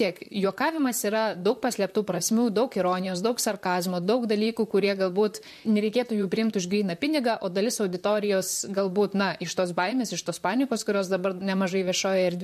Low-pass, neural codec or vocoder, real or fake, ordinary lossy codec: 14.4 kHz; vocoder, 44.1 kHz, 128 mel bands, Pupu-Vocoder; fake; MP3, 64 kbps